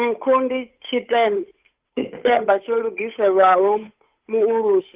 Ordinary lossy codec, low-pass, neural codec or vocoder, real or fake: Opus, 24 kbps; 3.6 kHz; codec, 16 kHz, 8 kbps, FunCodec, trained on Chinese and English, 25 frames a second; fake